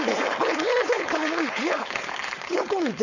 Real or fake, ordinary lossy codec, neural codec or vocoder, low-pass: fake; none; codec, 16 kHz, 4.8 kbps, FACodec; 7.2 kHz